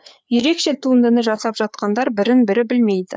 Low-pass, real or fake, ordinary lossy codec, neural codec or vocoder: none; fake; none; codec, 16 kHz, 4 kbps, FreqCodec, larger model